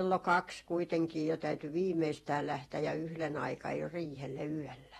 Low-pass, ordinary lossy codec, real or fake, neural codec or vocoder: 19.8 kHz; AAC, 32 kbps; fake; vocoder, 48 kHz, 128 mel bands, Vocos